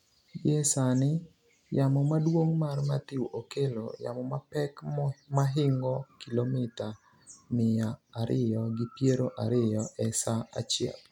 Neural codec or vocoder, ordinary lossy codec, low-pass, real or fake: none; none; 19.8 kHz; real